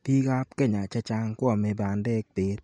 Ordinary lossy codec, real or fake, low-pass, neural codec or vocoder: MP3, 48 kbps; real; 19.8 kHz; none